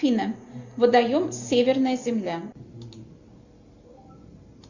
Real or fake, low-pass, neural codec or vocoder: real; 7.2 kHz; none